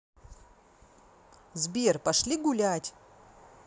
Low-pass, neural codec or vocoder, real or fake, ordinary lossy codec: none; none; real; none